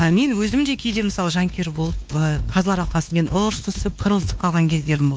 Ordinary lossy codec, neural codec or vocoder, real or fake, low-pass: none; codec, 16 kHz, 2 kbps, X-Codec, WavLM features, trained on Multilingual LibriSpeech; fake; none